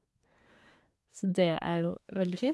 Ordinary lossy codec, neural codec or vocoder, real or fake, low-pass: none; codec, 24 kHz, 1 kbps, SNAC; fake; none